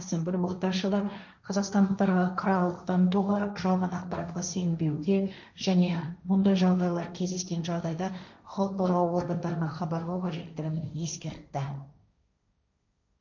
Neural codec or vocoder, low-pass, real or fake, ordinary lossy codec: codec, 16 kHz, 1.1 kbps, Voila-Tokenizer; 7.2 kHz; fake; none